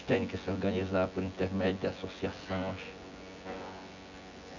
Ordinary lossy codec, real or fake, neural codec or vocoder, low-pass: none; fake; vocoder, 24 kHz, 100 mel bands, Vocos; 7.2 kHz